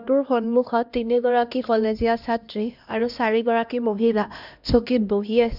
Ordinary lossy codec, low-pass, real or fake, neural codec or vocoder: none; 5.4 kHz; fake; codec, 16 kHz, 1 kbps, X-Codec, HuBERT features, trained on LibriSpeech